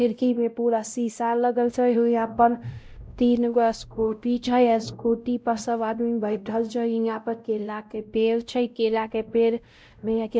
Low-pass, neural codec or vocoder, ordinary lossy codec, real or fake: none; codec, 16 kHz, 0.5 kbps, X-Codec, WavLM features, trained on Multilingual LibriSpeech; none; fake